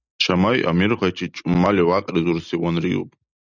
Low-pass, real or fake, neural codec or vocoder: 7.2 kHz; real; none